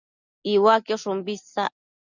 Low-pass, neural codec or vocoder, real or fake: 7.2 kHz; none; real